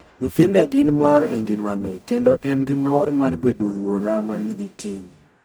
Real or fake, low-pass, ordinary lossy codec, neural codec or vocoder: fake; none; none; codec, 44.1 kHz, 0.9 kbps, DAC